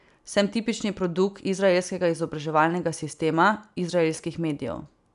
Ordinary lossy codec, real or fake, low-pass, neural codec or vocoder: none; real; 10.8 kHz; none